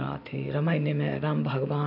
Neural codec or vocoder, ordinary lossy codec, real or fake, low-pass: none; AAC, 48 kbps; real; 5.4 kHz